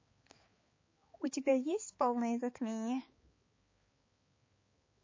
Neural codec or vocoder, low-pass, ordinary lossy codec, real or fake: codec, 16 kHz, 4 kbps, X-Codec, HuBERT features, trained on balanced general audio; 7.2 kHz; MP3, 32 kbps; fake